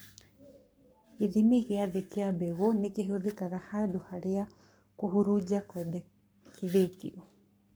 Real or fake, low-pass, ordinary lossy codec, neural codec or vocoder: fake; none; none; codec, 44.1 kHz, 7.8 kbps, Pupu-Codec